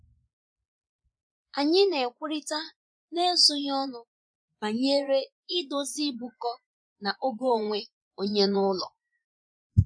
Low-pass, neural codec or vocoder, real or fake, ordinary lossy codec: 9.9 kHz; vocoder, 24 kHz, 100 mel bands, Vocos; fake; none